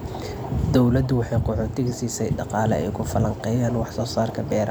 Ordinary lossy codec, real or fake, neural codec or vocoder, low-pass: none; real; none; none